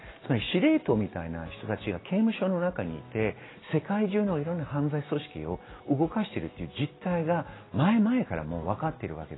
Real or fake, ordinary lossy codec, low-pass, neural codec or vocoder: real; AAC, 16 kbps; 7.2 kHz; none